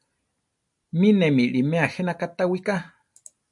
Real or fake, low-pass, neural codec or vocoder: real; 10.8 kHz; none